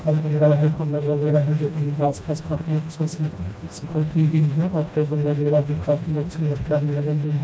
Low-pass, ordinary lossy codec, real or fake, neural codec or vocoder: none; none; fake; codec, 16 kHz, 1 kbps, FreqCodec, smaller model